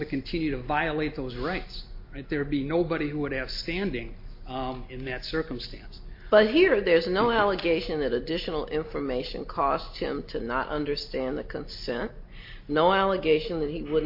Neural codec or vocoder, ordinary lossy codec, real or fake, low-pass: none; MP3, 32 kbps; real; 5.4 kHz